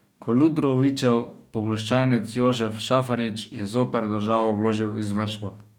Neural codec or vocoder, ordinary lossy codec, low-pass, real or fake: codec, 44.1 kHz, 2.6 kbps, DAC; none; 19.8 kHz; fake